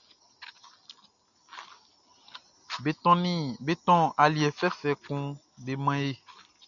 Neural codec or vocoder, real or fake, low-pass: none; real; 7.2 kHz